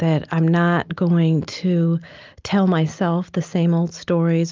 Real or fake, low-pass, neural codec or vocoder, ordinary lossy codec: real; 7.2 kHz; none; Opus, 24 kbps